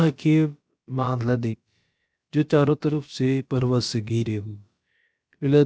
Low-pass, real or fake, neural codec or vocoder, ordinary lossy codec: none; fake; codec, 16 kHz, about 1 kbps, DyCAST, with the encoder's durations; none